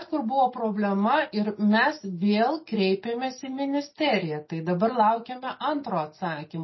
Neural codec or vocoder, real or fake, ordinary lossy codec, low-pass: none; real; MP3, 24 kbps; 7.2 kHz